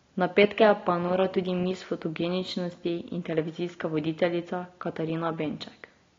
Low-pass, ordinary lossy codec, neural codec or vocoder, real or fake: 7.2 kHz; AAC, 32 kbps; none; real